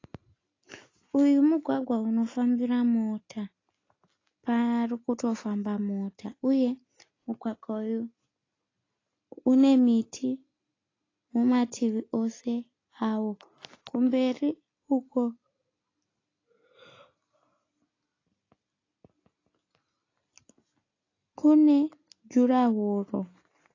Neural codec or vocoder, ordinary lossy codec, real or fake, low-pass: none; AAC, 32 kbps; real; 7.2 kHz